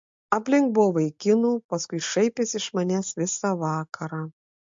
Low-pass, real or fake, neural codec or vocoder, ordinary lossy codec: 7.2 kHz; real; none; MP3, 48 kbps